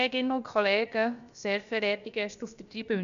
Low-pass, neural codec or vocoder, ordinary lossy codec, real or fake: 7.2 kHz; codec, 16 kHz, about 1 kbps, DyCAST, with the encoder's durations; MP3, 96 kbps; fake